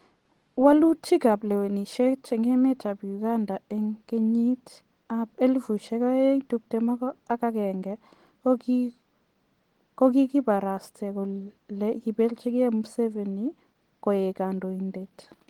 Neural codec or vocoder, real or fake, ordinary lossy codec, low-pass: none; real; Opus, 16 kbps; 19.8 kHz